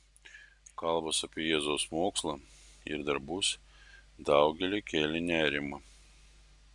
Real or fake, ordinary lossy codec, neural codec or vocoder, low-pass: real; Opus, 64 kbps; none; 10.8 kHz